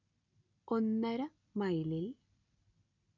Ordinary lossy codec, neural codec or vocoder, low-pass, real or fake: none; none; 7.2 kHz; real